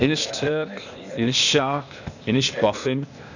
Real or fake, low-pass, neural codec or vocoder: fake; 7.2 kHz; codec, 16 kHz, 0.8 kbps, ZipCodec